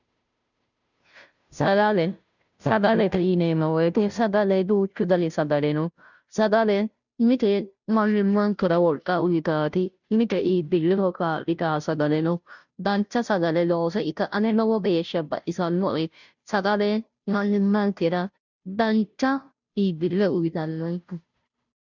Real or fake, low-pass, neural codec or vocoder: fake; 7.2 kHz; codec, 16 kHz, 0.5 kbps, FunCodec, trained on Chinese and English, 25 frames a second